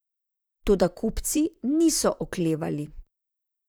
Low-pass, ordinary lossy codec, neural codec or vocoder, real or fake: none; none; vocoder, 44.1 kHz, 128 mel bands, Pupu-Vocoder; fake